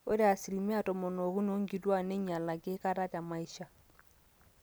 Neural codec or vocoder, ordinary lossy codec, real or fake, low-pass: none; none; real; none